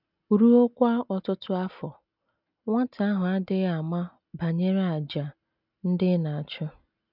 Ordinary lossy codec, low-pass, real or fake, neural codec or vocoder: none; 5.4 kHz; real; none